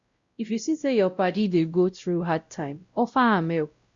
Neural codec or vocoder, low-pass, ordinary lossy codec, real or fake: codec, 16 kHz, 0.5 kbps, X-Codec, WavLM features, trained on Multilingual LibriSpeech; 7.2 kHz; Opus, 64 kbps; fake